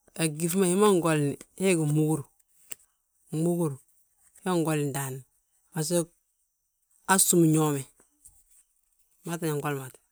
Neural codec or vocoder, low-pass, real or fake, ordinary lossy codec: none; none; real; none